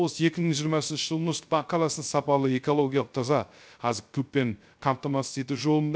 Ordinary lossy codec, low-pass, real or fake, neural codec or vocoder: none; none; fake; codec, 16 kHz, 0.3 kbps, FocalCodec